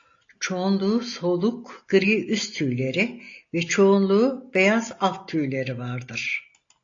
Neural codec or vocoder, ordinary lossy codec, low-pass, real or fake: none; AAC, 48 kbps; 7.2 kHz; real